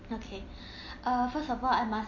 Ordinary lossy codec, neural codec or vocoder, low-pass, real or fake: MP3, 32 kbps; none; 7.2 kHz; real